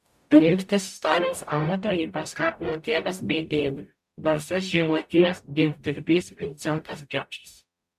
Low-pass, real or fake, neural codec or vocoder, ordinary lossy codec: 14.4 kHz; fake; codec, 44.1 kHz, 0.9 kbps, DAC; MP3, 96 kbps